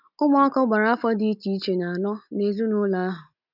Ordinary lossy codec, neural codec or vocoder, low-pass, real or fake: none; none; 5.4 kHz; real